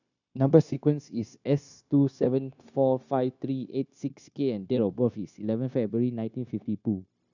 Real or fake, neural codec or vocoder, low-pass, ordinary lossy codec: fake; codec, 16 kHz, 0.9 kbps, LongCat-Audio-Codec; 7.2 kHz; none